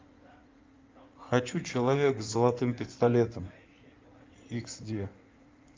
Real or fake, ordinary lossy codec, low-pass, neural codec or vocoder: fake; Opus, 24 kbps; 7.2 kHz; codec, 16 kHz in and 24 kHz out, 2.2 kbps, FireRedTTS-2 codec